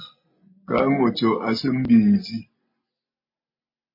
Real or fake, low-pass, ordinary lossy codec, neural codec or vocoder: real; 5.4 kHz; MP3, 24 kbps; none